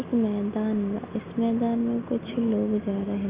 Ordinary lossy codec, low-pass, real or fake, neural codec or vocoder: Opus, 32 kbps; 3.6 kHz; real; none